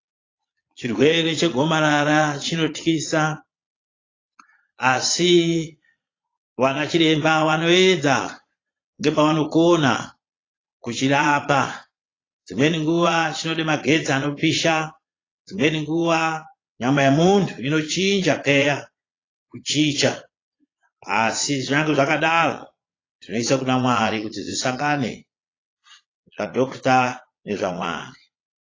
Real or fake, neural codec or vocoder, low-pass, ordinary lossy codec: fake; vocoder, 22.05 kHz, 80 mel bands, Vocos; 7.2 kHz; AAC, 32 kbps